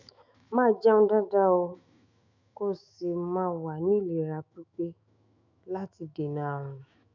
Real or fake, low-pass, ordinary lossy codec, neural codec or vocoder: fake; 7.2 kHz; none; autoencoder, 48 kHz, 128 numbers a frame, DAC-VAE, trained on Japanese speech